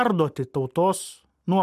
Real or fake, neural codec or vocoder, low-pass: real; none; 14.4 kHz